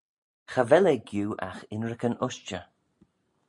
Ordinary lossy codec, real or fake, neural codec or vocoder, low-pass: MP3, 48 kbps; real; none; 10.8 kHz